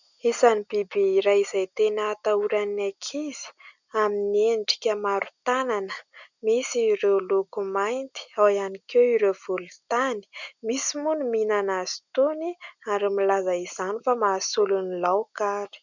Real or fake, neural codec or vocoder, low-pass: real; none; 7.2 kHz